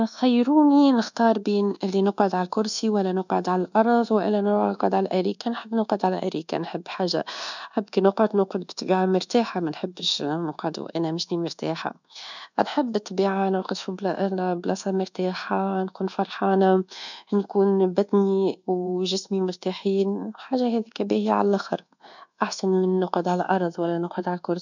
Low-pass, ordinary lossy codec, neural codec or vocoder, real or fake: 7.2 kHz; none; codec, 24 kHz, 1.2 kbps, DualCodec; fake